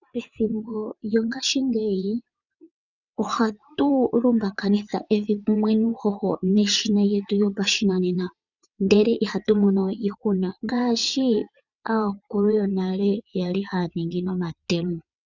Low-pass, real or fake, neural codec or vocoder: 7.2 kHz; fake; vocoder, 22.05 kHz, 80 mel bands, WaveNeXt